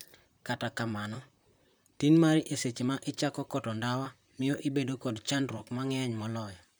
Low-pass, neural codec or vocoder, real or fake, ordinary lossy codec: none; vocoder, 44.1 kHz, 128 mel bands, Pupu-Vocoder; fake; none